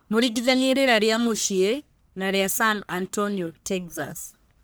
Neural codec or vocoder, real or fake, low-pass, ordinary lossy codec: codec, 44.1 kHz, 1.7 kbps, Pupu-Codec; fake; none; none